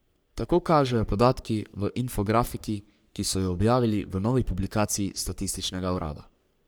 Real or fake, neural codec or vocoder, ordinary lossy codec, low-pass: fake; codec, 44.1 kHz, 3.4 kbps, Pupu-Codec; none; none